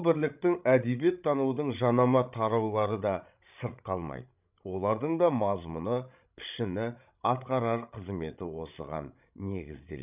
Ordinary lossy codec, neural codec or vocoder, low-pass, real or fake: none; codec, 16 kHz, 16 kbps, FreqCodec, larger model; 3.6 kHz; fake